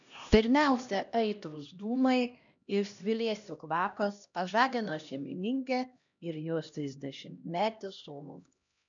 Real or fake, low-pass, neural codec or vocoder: fake; 7.2 kHz; codec, 16 kHz, 1 kbps, X-Codec, HuBERT features, trained on LibriSpeech